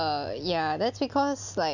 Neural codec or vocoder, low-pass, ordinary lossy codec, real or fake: none; 7.2 kHz; none; real